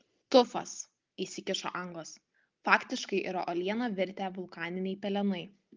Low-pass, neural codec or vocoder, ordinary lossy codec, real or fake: 7.2 kHz; none; Opus, 32 kbps; real